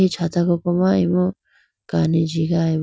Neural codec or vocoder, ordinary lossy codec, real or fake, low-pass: none; none; real; none